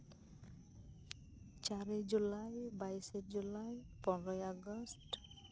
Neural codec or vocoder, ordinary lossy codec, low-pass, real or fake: none; none; none; real